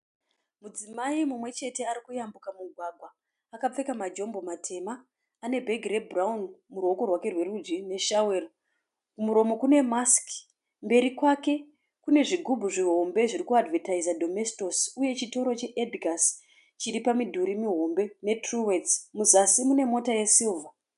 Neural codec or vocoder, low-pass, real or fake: none; 10.8 kHz; real